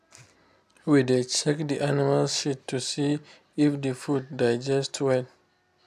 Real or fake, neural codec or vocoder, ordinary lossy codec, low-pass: real; none; AAC, 96 kbps; 14.4 kHz